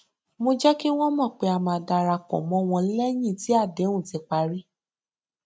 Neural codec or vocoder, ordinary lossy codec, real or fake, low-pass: none; none; real; none